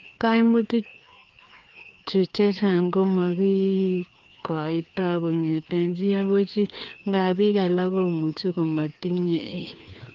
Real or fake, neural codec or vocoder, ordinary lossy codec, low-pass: fake; codec, 16 kHz, 2 kbps, FreqCodec, larger model; Opus, 24 kbps; 7.2 kHz